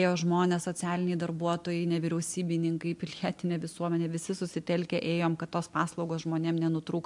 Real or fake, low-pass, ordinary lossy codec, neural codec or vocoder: real; 10.8 kHz; MP3, 64 kbps; none